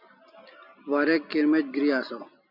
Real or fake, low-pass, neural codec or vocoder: real; 5.4 kHz; none